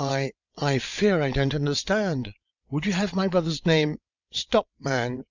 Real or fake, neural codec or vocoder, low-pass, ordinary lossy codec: fake; vocoder, 22.05 kHz, 80 mel bands, WaveNeXt; 7.2 kHz; Opus, 64 kbps